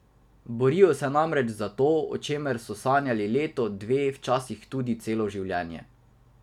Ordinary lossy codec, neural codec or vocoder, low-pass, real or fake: none; none; 19.8 kHz; real